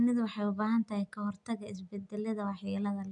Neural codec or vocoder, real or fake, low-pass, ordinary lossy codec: none; real; 9.9 kHz; none